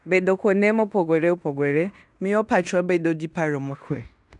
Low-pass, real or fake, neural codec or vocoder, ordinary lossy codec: 10.8 kHz; fake; codec, 16 kHz in and 24 kHz out, 0.9 kbps, LongCat-Audio-Codec, fine tuned four codebook decoder; none